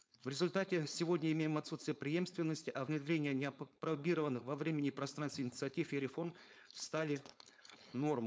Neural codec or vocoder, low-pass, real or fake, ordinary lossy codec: codec, 16 kHz, 4.8 kbps, FACodec; none; fake; none